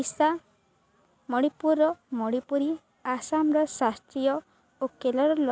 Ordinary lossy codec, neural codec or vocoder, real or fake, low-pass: none; none; real; none